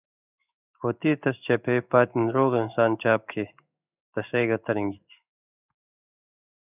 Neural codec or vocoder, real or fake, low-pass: codec, 16 kHz in and 24 kHz out, 1 kbps, XY-Tokenizer; fake; 3.6 kHz